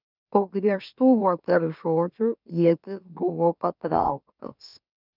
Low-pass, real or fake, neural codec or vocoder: 5.4 kHz; fake; autoencoder, 44.1 kHz, a latent of 192 numbers a frame, MeloTTS